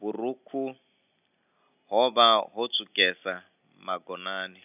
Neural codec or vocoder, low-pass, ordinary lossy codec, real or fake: none; 3.6 kHz; none; real